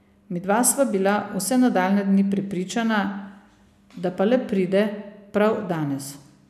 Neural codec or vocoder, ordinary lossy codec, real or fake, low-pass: none; none; real; 14.4 kHz